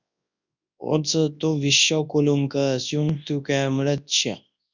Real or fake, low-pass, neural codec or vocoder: fake; 7.2 kHz; codec, 24 kHz, 0.9 kbps, WavTokenizer, large speech release